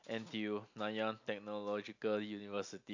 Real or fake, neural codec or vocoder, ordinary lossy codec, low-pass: real; none; AAC, 48 kbps; 7.2 kHz